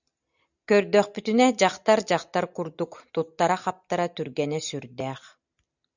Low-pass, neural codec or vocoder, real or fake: 7.2 kHz; none; real